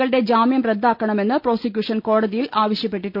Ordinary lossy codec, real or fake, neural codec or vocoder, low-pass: none; real; none; 5.4 kHz